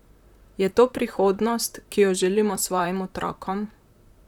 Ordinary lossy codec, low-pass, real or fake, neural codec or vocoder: none; 19.8 kHz; fake; vocoder, 44.1 kHz, 128 mel bands, Pupu-Vocoder